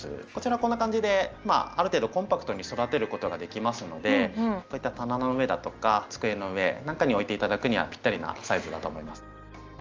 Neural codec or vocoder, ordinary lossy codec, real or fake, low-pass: none; Opus, 24 kbps; real; 7.2 kHz